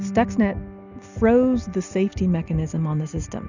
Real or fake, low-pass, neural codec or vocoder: real; 7.2 kHz; none